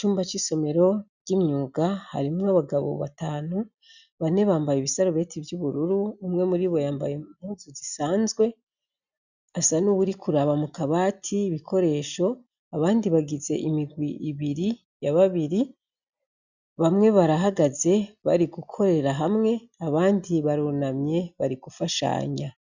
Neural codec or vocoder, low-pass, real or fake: none; 7.2 kHz; real